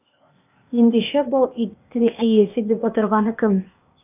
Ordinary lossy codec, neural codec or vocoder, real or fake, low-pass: none; codec, 16 kHz, 0.8 kbps, ZipCodec; fake; 3.6 kHz